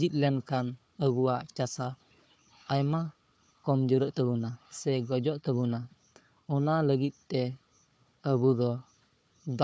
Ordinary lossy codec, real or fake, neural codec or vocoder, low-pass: none; fake; codec, 16 kHz, 4 kbps, FunCodec, trained on Chinese and English, 50 frames a second; none